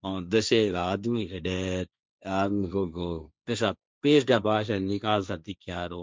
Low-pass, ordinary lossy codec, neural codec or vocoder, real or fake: none; none; codec, 16 kHz, 1.1 kbps, Voila-Tokenizer; fake